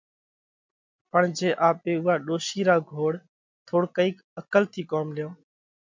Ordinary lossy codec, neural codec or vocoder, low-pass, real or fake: MP3, 64 kbps; none; 7.2 kHz; real